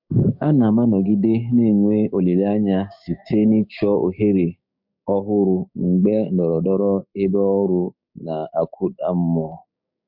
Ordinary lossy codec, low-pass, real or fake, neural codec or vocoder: MP3, 48 kbps; 5.4 kHz; fake; codec, 16 kHz, 6 kbps, DAC